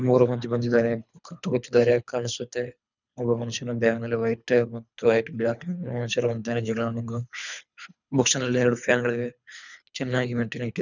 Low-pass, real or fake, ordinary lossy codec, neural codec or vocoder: 7.2 kHz; fake; none; codec, 24 kHz, 3 kbps, HILCodec